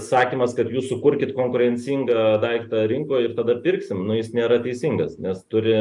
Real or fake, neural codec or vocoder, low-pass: real; none; 10.8 kHz